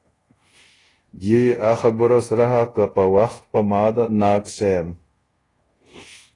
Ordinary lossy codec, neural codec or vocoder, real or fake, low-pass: AAC, 32 kbps; codec, 24 kHz, 0.5 kbps, DualCodec; fake; 10.8 kHz